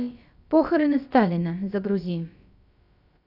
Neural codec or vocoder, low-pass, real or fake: codec, 16 kHz, about 1 kbps, DyCAST, with the encoder's durations; 5.4 kHz; fake